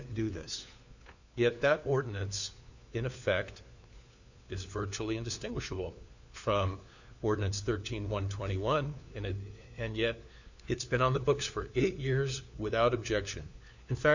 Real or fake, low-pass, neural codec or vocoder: fake; 7.2 kHz; codec, 16 kHz, 4 kbps, FunCodec, trained on LibriTTS, 50 frames a second